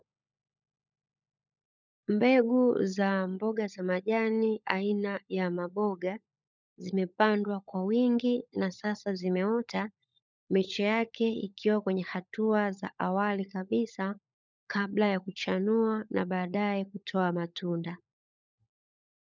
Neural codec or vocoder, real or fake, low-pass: codec, 16 kHz, 16 kbps, FunCodec, trained on LibriTTS, 50 frames a second; fake; 7.2 kHz